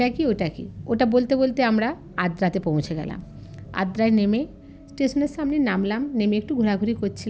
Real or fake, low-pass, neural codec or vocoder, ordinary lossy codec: real; none; none; none